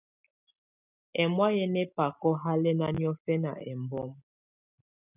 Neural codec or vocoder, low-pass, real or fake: none; 3.6 kHz; real